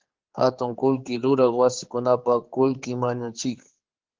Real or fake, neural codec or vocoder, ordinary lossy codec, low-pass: fake; codec, 16 kHz, 4 kbps, X-Codec, HuBERT features, trained on general audio; Opus, 16 kbps; 7.2 kHz